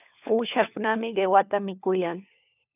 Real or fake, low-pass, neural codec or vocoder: fake; 3.6 kHz; codec, 16 kHz, 4 kbps, FunCodec, trained on LibriTTS, 50 frames a second